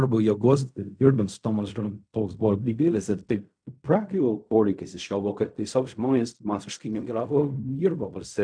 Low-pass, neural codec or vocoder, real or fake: 9.9 kHz; codec, 16 kHz in and 24 kHz out, 0.4 kbps, LongCat-Audio-Codec, fine tuned four codebook decoder; fake